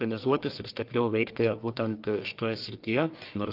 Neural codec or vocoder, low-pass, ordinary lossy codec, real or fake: codec, 44.1 kHz, 1.7 kbps, Pupu-Codec; 5.4 kHz; Opus, 24 kbps; fake